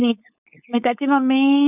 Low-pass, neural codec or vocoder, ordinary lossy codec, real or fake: 3.6 kHz; codec, 16 kHz, 4.8 kbps, FACodec; none; fake